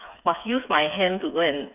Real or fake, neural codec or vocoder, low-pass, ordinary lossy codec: fake; codec, 16 kHz, 4 kbps, FreqCodec, smaller model; 3.6 kHz; none